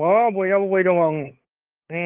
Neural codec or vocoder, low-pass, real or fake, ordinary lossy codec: codec, 16 kHz, 8 kbps, FunCodec, trained on LibriTTS, 25 frames a second; 3.6 kHz; fake; Opus, 32 kbps